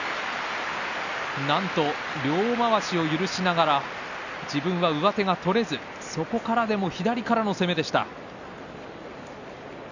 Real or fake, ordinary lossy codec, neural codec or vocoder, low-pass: real; none; none; 7.2 kHz